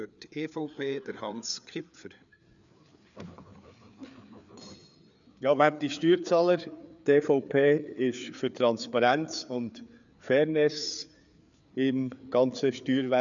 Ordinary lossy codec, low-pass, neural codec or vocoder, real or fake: none; 7.2 kHz; codec, 16 kHz, 4 kbps, FreqCodec, larger model; fake